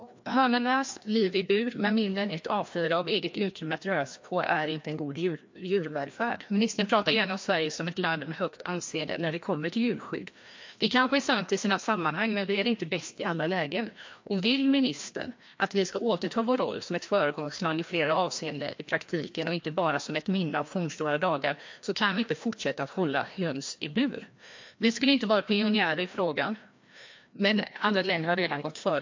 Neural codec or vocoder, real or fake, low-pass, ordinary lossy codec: codec, 16 kHz, 1 kbps, FreqCodec, larger model; fake; 7.2 kHz; MP3, 48 kbps